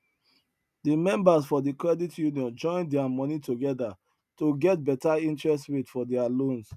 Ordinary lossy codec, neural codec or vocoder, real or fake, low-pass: none; none; real; 14.4 kHz